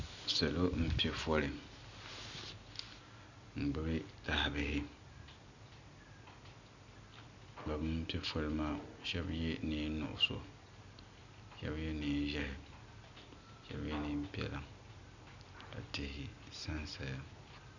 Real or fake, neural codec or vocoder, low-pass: real; none; 7.2 kHz